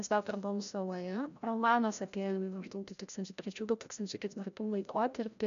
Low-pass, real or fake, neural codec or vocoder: 7.2 kHz; fake; codec, 16 kHz, 0.5 kbps, FreqCodec, larger model